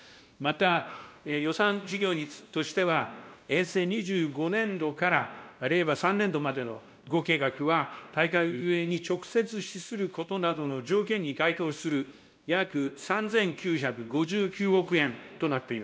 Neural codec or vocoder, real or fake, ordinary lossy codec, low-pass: codec, 16 kHz, 1 kbps, X-Codec, WavLM features, trained on Multilingual LibriSpeech; fake; none; none